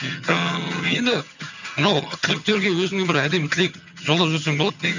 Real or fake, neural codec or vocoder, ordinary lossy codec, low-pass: fake; vocoder, 22.05 kHz, 80 mel bands, HiFi-GAN; none; 7.2 kHz